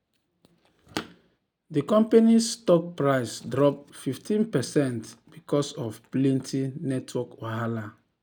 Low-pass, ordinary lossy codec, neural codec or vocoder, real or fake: none; none; none; real